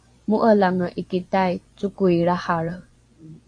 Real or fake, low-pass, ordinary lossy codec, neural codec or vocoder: fake; 9.9 kHz; AAC, 48 kbps; vocoder, 24 kHz, 100 mel bands, Vocos